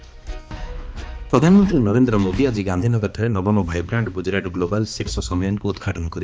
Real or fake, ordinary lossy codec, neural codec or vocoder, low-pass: fake; none; codec, 16 kHz, 2 kbps, X-Codec, HuBERT features, trained on balanced general audio; none